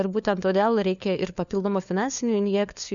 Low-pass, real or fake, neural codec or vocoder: 7.2 kHz; fake; codec, 16 kHz, 4 kbps, FunCodec, trained on LibriTTS, 50 frames a second